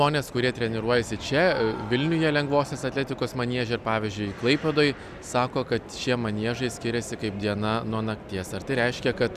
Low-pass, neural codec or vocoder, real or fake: 14.4 kHz; none; real